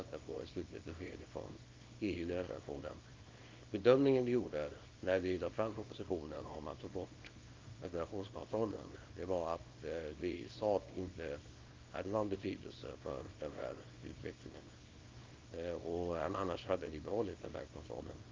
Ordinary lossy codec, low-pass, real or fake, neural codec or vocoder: Opus, 24 kbps; 7.2 kHz; fake; codec, 24 kHz, 0.9 kbps, WavTokenizer, small release